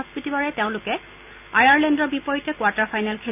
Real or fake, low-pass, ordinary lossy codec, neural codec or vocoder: real; 3.6 kHz; none; none